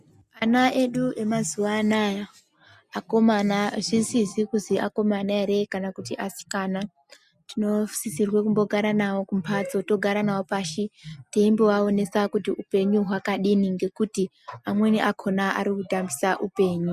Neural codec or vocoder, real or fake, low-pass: none; real; 14.4 kHz